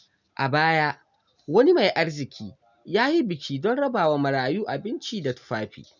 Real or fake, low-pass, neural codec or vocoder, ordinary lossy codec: real; 7.2 kHz; none; none